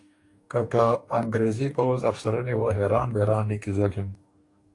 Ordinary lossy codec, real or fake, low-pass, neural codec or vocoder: AAC, 48 kbps; fake; 10.8 kHz; codec, 44.1 kHz, 2.6 kbps, DAC